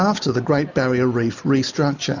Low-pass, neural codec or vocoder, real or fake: 7.2 kHz; none; real